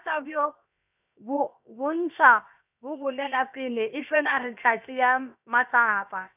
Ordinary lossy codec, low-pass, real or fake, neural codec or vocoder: none; 3.6 kHz; fake; codec, 16 kHz, 0.7 kbps, FocalCodec